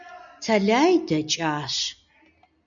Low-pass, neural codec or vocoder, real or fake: 7.2 kHz; none; real